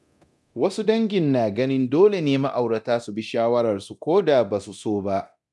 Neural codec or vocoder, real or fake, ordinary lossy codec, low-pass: codec, 24 kHz, 0.9 kbps, DualCodec; fake; none; none